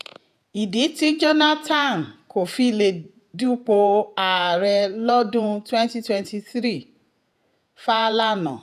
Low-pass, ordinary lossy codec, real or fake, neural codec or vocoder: 14.4 kHz; none; fake; vocoder, 48 kHz, 128 mel bands, Vocos